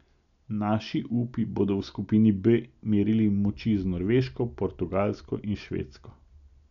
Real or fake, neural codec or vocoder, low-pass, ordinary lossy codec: real; none; 7.2 kHz; none